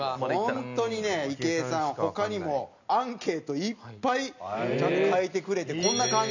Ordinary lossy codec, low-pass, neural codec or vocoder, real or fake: none; 7.2 kHz; none; real